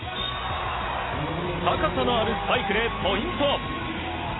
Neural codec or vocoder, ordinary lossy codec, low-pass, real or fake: none; AAC, 16 kbps; 7.2 kHz; real